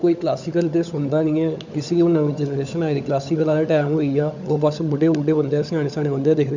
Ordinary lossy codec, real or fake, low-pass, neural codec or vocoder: none; fake; 7.2 kHz; codec, 16 kHz, 16 kbps, FunCodec, trained on LibriTTS, 50 frames a second